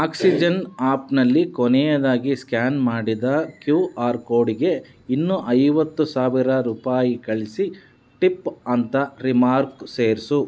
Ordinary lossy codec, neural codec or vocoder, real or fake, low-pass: none; none; real; none